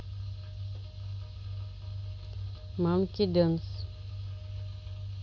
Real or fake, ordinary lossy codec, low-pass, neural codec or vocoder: real; none; none; none